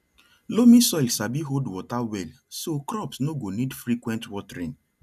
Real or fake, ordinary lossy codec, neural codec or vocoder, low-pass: real; none; none; 14.4 kHz